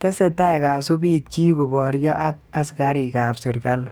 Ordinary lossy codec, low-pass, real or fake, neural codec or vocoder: none; none; fake; codec, 44.1 kHz, 2.6 kbps, DAC